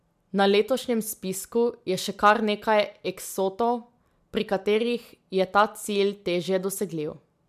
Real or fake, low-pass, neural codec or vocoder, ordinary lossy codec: real; 14.4 kHz; none; MP3, 96 kbps